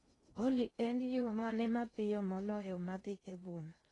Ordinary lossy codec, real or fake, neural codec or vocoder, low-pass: AAC, 32 kbps; fake; codec, 16 kHz in and 24 kHz out, 0.6 kbps, FocalCodec, streaming, 2048 codes; 10.8 kHz